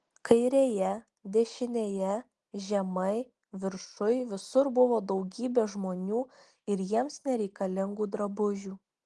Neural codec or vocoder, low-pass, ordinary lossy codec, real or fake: none; 10.8 kHz; Opus, 24 kbps; real